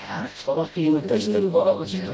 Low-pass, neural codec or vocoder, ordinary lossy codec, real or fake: none; codec, 16 kHz, 0.5 kbps, FreqCodec, smaller model; none; fake